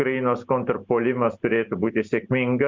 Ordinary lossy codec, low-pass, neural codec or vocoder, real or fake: MP3, 64 kbps; 7.2 kHz; none; real